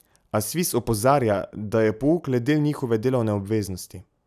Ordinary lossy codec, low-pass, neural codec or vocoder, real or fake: none; 14.4 kHz; none; real